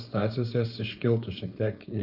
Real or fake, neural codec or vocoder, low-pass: fake; codec, 16 kHz, 4.8 kbps, FACodec; 5.4 kHz